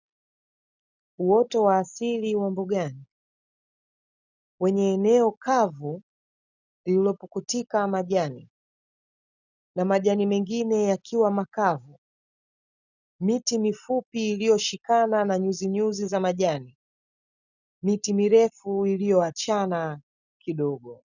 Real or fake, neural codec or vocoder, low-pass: real; none; 7.2 kHz